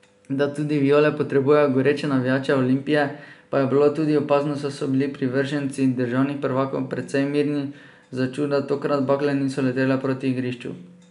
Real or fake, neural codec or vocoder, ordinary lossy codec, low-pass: real; none; none; 10.8 kHz